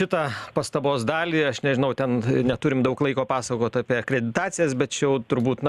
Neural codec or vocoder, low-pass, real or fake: none; 14.4 kHz; real